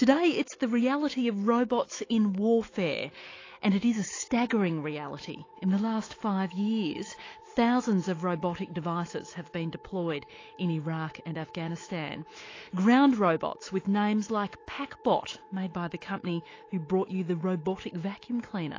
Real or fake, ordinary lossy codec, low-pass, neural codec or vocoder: fake; AAC, 32 kbps; 7.2 kHz; autoencoder, 48 kHz, 128 numbers a frame, DAC-VAE, trained on Japanese speech